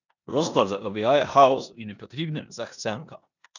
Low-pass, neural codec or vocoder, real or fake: 7.2 kHz; codec, 16 kHz in and 24 kHz out, 0.9 kbps, LongCat-Audio-Codec, four codebook decoder; fake